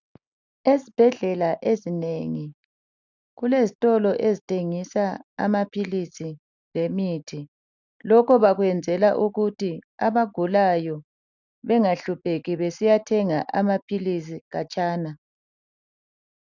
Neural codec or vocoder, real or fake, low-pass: none; real; 7.2 kHz